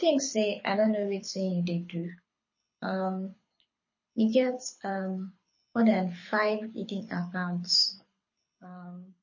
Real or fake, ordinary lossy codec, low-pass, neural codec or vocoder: fake; MP3, 32 kbps; 7.2 kHz; codec, 24 kHz, 6 kbps, HILCodec